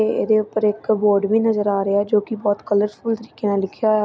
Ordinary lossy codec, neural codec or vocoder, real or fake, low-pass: none; none; real; none